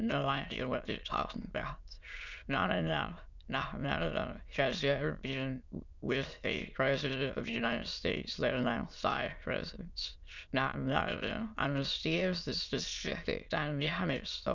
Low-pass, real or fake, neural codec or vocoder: 7.2 kHz; fake; autoencoder, 22.05 kHz, a latent of 192 numbers a frame, VITS, trained on many speakers